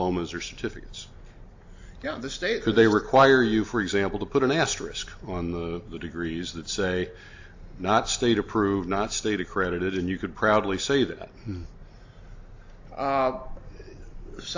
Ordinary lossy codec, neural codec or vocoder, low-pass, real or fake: AAC, 48 kbps; none; 7.2 kHz; real